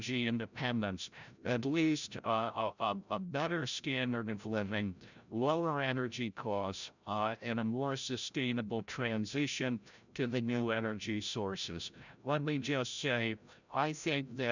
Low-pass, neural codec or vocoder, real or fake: 7.2 kHz; codec, 16 kHz, 0.5 kbps, FreqCodec, larger model; fake